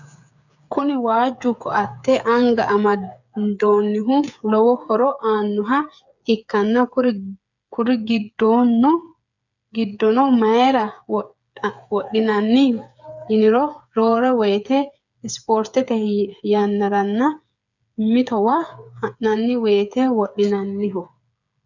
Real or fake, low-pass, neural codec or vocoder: fake; 7.2 kHz; codec, 16 kHz, 8 kbps, FreqCodec, smaller model